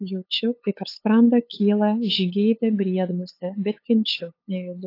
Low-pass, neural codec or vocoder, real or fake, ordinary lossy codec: 5.4 kHz; codec, 16 kHz, 8 kbps, FunCodec, trained on LibriTTS, 25 frames a second; fake; AAC, 32 kbps